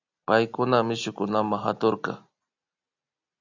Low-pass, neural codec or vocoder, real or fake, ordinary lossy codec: 7.2 kHz; none; real; AAC, 48 kbps